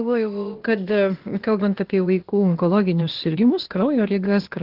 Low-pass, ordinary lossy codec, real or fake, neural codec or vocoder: 5.4 kHz; Opus, 16 kbps; fake; codec, 16 kHz, 0.8 kbps, ZipCodec